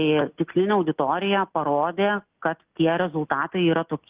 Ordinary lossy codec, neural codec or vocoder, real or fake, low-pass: Opus, 32 kbps; none; real; 3.6 kHz